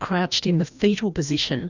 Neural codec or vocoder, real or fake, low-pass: codec, 16 kHz, 1 kbps, FreqCodec, larger model; fake; 7.2 kHz